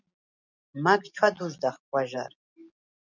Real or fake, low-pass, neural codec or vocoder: real; 7.2 kHz; none